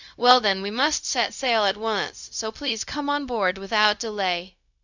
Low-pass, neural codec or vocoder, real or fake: 7.2 kHz; codec, 16 kHz, 0.4 kbps, LongCat-Audio-Codec; fake